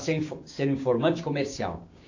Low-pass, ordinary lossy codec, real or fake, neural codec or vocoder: 7.2 kHz; AAC, 48 kbps; fake; codec, 16 kHz, 6 kbps, DAC